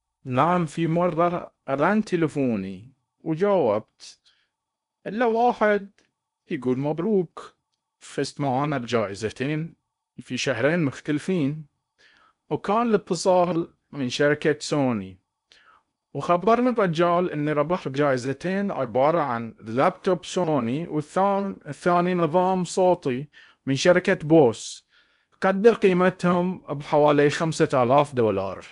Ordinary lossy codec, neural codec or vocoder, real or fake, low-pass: MP3, 96 kbps; codec, 16 kHz in and 24 kHz out, 0.8 kbps, FocalCodec, streaming, 65536 codes; fake; 10.8 kHz